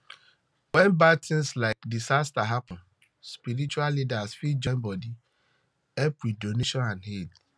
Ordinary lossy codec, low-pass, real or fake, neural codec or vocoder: none; none; real; none